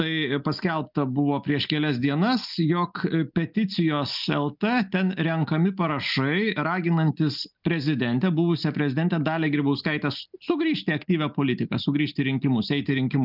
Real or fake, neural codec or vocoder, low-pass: real; none; 5.4 kHz